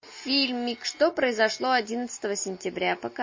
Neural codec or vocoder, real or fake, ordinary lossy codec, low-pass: none; real; MP3, 32 kbps; 7.2 kHz